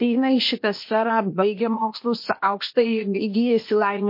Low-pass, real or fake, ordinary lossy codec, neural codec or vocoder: 5.4 kHz; fake; MP3, 32 kbps; codec, 16 kHz, 0.8 kbps, ZipCodec